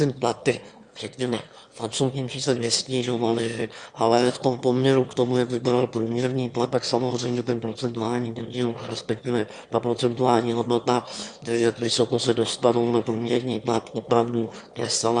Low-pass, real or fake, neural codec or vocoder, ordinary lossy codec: 9.9 kHz; fake; autoencoder, 22.05 kHz, a latent of 192 numbers a frame, VITS, trained on one speaker; Opus, 64 kbps